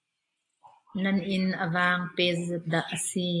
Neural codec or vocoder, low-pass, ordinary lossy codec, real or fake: none; 10.8 kHz; AAC, 48 kbps; real